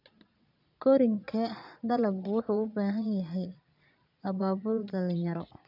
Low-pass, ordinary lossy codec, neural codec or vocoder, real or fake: 5.4 kHz; none; vocoder, 22.05 kHz, 80 mel bands, Vocos; fake